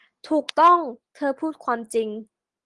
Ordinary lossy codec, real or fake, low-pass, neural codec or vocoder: Opus, 24 kbps; real; 10.8 kHz; none